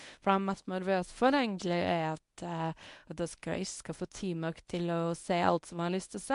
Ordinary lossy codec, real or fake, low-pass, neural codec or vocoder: MP3, 64 kbps; fake; 10.8 kHz; codec, 24 kHz, 0.9 kbps, WavTokenizer, medium speech release version 1